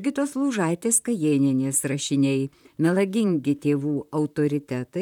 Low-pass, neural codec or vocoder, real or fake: 19.8 kHz; vocoder, 44.1 kHz, 128 mel bands, Pupu-Vocoder; fake